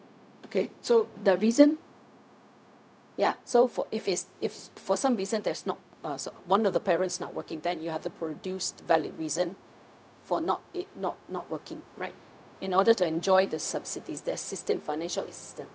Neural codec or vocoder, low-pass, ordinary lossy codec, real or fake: codec, 16 kHz, 0.4 kbps, LongCat-Audio-Codec; none; none; fake